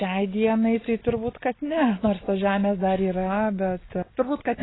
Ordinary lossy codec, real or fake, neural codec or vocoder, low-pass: AAC, 16 kbps; real; none; 7.2 kHz